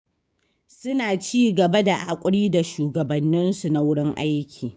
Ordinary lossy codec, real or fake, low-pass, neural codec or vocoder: none; fake; none; codec, 16 kHz, 6 kbps, DAC